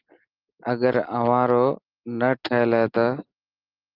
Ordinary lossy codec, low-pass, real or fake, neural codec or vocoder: Opus, 32 kbps; 5.4 kHz; real; none